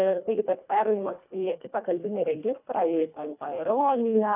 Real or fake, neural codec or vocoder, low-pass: fake; codec, 24 kHz, 1.5 kbps, HILCodec; 3.6 kHz